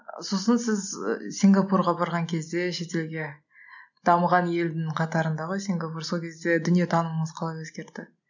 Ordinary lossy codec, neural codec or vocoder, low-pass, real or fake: none; none; 7.2 kHz; real